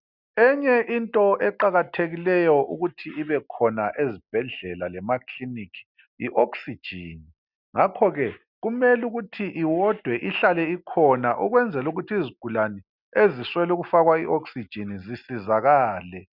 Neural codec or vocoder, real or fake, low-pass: none; real; 5.4 kHz